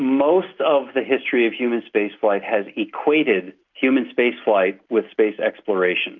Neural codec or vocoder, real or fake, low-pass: none; real; 7.2 kHz